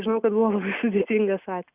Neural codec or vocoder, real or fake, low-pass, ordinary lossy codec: none; real; 3.6 kHz; Opus, 64 kbps